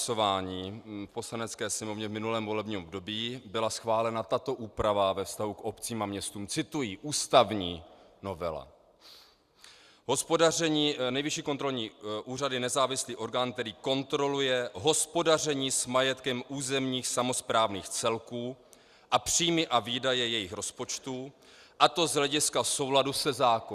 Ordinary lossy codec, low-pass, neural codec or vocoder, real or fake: Opus, 64 kbps; 14.4 kHz; none; real